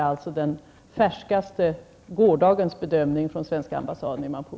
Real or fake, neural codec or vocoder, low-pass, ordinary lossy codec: real; none; none; none